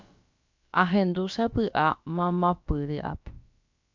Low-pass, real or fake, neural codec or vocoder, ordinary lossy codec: 7.2 kHz; fake; codec, 16 kHz, about 1 kbps, DyCAST, with the encoder's durations; MP3, 64 kbps